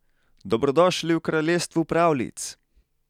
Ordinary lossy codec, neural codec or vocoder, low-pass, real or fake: none; none; 19.8 kHz; real